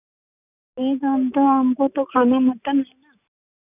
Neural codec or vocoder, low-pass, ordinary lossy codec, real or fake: none; 3.6 kHz; none; real